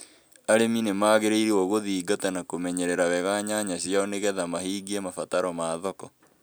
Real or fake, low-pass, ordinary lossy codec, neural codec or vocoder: real; none; none; none